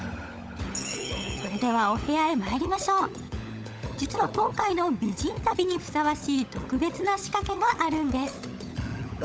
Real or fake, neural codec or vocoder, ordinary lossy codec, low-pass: fake; codec, 16 kHz, 16 kbps, FunCodec, trained on LibriTTS, 50 frames a second; none; none